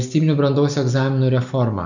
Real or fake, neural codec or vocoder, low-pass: real; none; 7.2 kHz